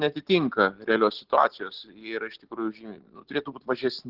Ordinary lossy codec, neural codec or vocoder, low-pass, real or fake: Opus, 16 kbps; none; 5.4 kHz; real